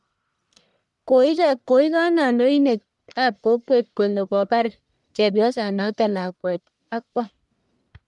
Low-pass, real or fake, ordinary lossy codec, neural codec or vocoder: 10.8 kHz; fake; none; codec, 44.1 kHz, 1.7 kbps, Pupu-Codec